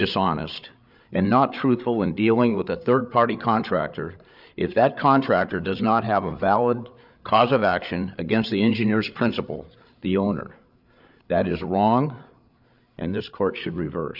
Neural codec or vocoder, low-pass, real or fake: codec, 16 kHz, 8 kbps, FreqCodec, larger model; 5.4 kHz; fake